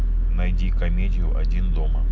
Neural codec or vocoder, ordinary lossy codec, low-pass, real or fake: none; none; none; real